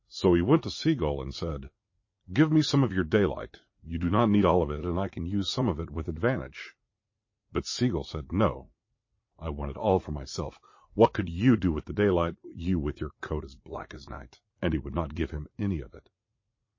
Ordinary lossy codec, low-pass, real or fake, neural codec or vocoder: MP3, 32 kbps; 7.2 kHz; fake; vocoder, 44.1 kHz, 80 mel bands, Vocos